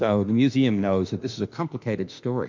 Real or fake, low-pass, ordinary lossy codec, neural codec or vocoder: fake; 7.2 kHz; MP3, 64 kbps; autoencoder, 48 kHz, 32 numbers a frame, DAC-VAE, trained on Japanese speech